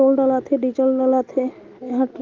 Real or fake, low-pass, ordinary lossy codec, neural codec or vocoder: real; 7.2 kHz; Opus, 24 kbps; none